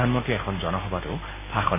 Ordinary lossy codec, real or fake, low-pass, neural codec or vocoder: MP3, 16 kbps; real; 3.6 kHz; none